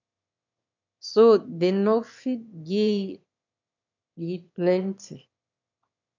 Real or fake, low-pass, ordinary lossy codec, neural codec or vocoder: fake; 7.2 kHz; MP3, 64 kbps; autoencoder, 22.05 kHz, a latent of 192 numbers a frame, VITS, trained on one speaker